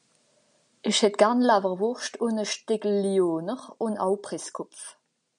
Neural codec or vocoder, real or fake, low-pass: none; real; 9.9 kHz